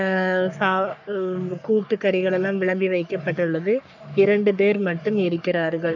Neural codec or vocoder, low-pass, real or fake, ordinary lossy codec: codec, 44.1 kHz, 3.4 kbps, Pupu-Codec; 7.2 kHz; fake; none